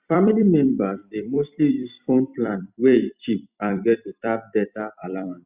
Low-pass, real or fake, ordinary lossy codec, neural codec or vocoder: 3.6 kHz; fake; Opus, 64 kbps; vocoder, 44.1 kHz, 128 mel bands every 256 samples, BigVGAN v2